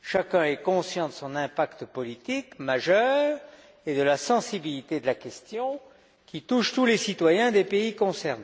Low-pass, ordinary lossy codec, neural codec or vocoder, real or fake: none; none; none; real